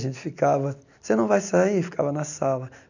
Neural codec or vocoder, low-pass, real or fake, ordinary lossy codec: none; 7.2 kHz; real; none